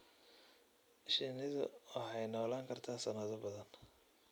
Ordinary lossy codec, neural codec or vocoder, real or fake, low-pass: none; none; real; none